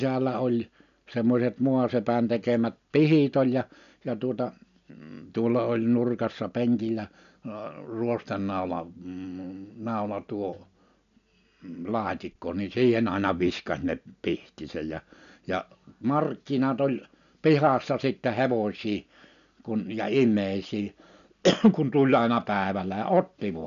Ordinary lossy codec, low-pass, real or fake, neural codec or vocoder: none; 7.2 kHz; real; none